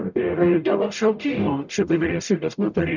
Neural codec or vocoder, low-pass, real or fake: codec, 44.1 kHz, 0.9 kbps, DAC; 7.2 kHz; fake